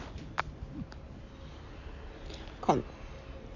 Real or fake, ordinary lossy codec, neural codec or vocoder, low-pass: real; none; none; 7.2 kHz